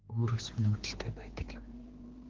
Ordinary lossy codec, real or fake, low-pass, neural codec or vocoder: Opus, 16 kbps; fake; 7.2 kHz; codec, 16 kHz, 2 kbps, X-Codec, HuBERT features, trained on general audio